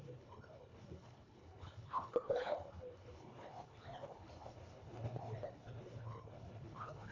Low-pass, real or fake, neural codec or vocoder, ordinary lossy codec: 7.2 kHz; fake; codec, 24 kHz, 1.5 kbps, HILCodec; AAC, 32 kbps